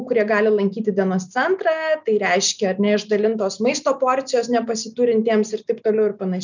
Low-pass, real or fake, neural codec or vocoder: 7.2 kHz; real; none